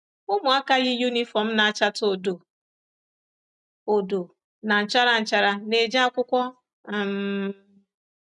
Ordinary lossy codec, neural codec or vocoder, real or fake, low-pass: none; none; real; 10.8 kHz